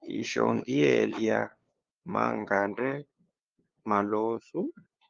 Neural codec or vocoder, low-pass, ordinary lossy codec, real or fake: codec, 16 kHz, 2 kbps, FunCodec, trained on LibriTTS, 25 frames a second; 7.2 kHz; Opus, 32 kbps; fake